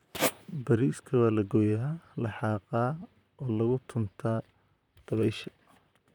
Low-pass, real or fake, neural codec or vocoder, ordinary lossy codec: 19.8 kHz; fake; vocoder, 44.1 kHz, 128 mel bands, Pupu-Vocoder; none